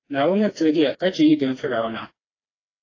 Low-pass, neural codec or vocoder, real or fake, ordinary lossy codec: 7.2 kHz; codec, 16 kHz, 2 kbps, FreqCodec, smaller model; fake; AAC, 32 kbps